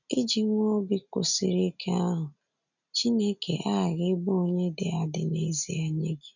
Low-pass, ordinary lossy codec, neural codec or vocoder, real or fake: 7.2 kHz; none; none; real